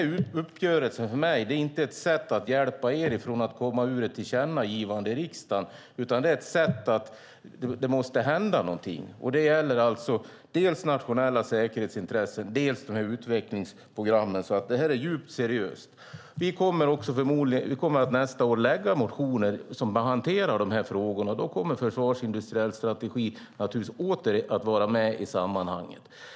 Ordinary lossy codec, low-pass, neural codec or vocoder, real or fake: none; none; none; real